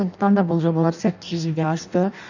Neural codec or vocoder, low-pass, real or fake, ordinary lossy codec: codec, 16 kHz in and 24 kHz out, 0.6 kbps, FireRedTTS-2 codec; 7.2 kHz; fake; none